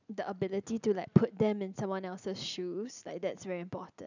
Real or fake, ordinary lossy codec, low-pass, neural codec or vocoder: real; none; 7.2 kHz; none